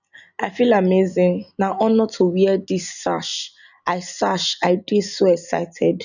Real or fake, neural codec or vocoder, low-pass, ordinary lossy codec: real; none; 7.2 kHz; none